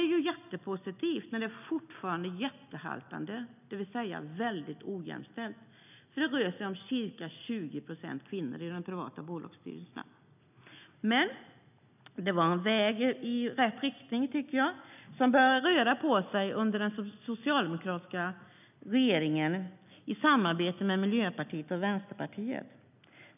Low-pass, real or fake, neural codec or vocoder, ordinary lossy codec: 3.6 kHz; real; none; none